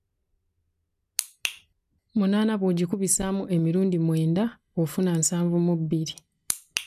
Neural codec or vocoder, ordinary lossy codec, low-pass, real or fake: none; none; 14.4 kHz; real